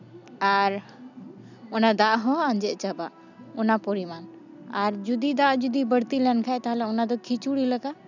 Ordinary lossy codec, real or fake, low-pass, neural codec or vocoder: none; real; 7.2 kHz; none